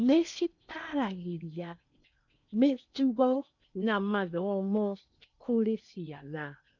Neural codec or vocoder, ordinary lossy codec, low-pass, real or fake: codec, 16 kHz in and 24 kHz out, 0.8 kbps, FocalCodec, streaming, 65536 codes; none; 7.2 kHz; fake